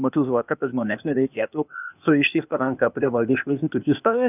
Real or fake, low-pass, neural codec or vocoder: fake; 3.6 kHz; codec, 16 kHz, 0.8 kbps, ZipCodec